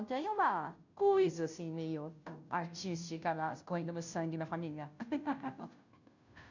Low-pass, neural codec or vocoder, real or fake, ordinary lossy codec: 7.2 kHz; codec, 16 kHz, 0.5 kbps, FunCodec, trained on Chinese and English, 25 frames a second; fake; none